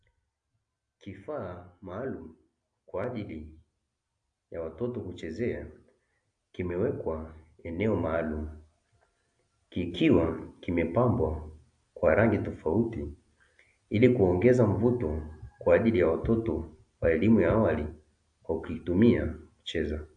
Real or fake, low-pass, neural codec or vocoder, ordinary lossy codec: real; 9.9 kHz; none; AAC, 64 kbps